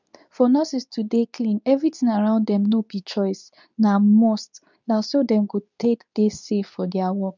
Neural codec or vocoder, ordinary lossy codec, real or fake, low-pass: codec, 24 kHz, 0.9 kbps, WavTokenizer, medium speech release version 2; none; fake; 7.2 kHz